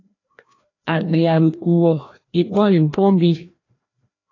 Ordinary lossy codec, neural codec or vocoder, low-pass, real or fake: AAC, 32 kbps; codec, 16 kHz, 1 kbps, FreqCodec, larger model; 7.2 kHz; fake